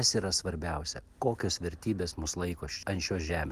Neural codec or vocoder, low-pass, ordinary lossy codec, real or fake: none; 14.4 kHz; Opus, 24 kbps; real